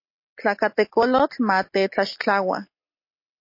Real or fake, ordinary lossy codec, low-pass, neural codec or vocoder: real; MP3, 32 kbps; 5.4 kHz; none